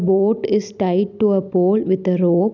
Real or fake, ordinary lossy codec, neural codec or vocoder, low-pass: real; none; none; 7.2 kHz